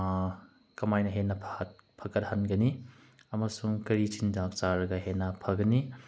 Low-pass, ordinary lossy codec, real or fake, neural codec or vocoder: none; none; real; none